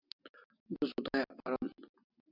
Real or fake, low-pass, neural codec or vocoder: real; 5.4 kHz; none